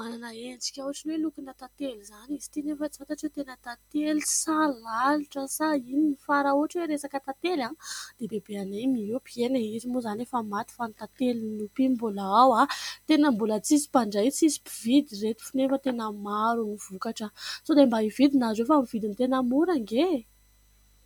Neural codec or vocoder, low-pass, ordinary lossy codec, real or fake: none; 19.8 kHz; MP3, 96 kbps; real